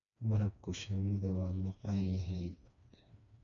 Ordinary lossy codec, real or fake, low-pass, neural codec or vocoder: none; fake; 7.2 kHz; codec, 16 kHz, 2 kbps, FreqCodec, smaller model